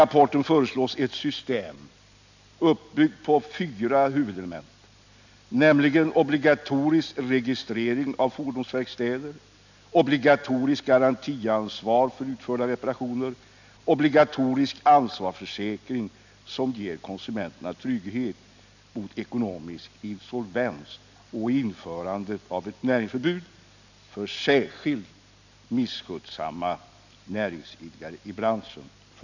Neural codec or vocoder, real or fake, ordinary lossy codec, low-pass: none; real; none; 7.2 kHz